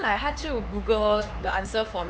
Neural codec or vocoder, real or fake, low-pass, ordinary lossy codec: codec, 16 kHz, 4 kbps, X-Codec, HuBERT features, trained on LibriSpeech; fake; none; none